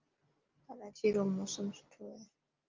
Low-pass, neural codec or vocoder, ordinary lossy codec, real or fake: 7.2 kHz; none; Opus, 32 kbps; real